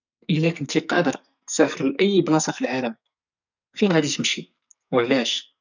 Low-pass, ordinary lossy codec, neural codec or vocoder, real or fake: 7.2 kHz; none; codec, 44.1 kHz, 2.6 kbps, SNAC; fake